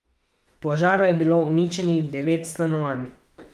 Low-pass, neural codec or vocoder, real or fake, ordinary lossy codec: 14.4 kHz; autoencoder, 48 kHz, 32 numbers a frame, DAC-VAE, trained on Japanese speech; fake; Opus, 32 kbps